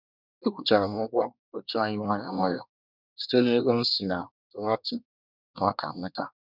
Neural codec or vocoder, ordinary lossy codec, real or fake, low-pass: codec, 24 kHz, 1 kbps, SNAC; none; fake; 5.4 kHz